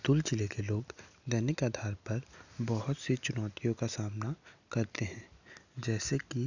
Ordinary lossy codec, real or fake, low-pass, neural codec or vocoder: none; real; 7.2 kHz; none